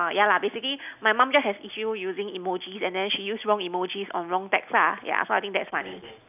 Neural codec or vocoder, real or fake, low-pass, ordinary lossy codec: none; real; 3.6 kHz; none